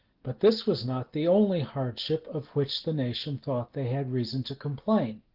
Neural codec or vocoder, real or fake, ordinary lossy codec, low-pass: none; real; Opus, 16 kbps; 5.4 kHz